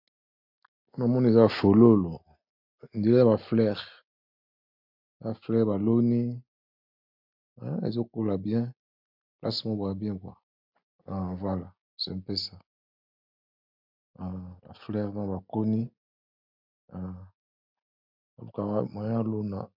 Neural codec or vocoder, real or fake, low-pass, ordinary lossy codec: none; real; 5.4 kHz; MP3, 48 kbps